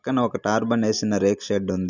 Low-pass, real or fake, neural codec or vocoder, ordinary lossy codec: 7.2 kHz; real; none; none